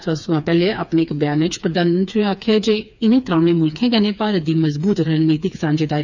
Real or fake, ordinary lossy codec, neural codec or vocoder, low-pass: fake; none; codec, 16 kHz, 4 kbps, FreqCodec, smaller model; 7.2 kHz